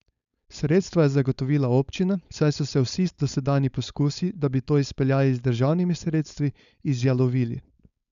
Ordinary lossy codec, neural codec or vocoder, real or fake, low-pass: none; codec, 16 kHz, 4.8 kbps, FACodec; fake; 7.2 kHz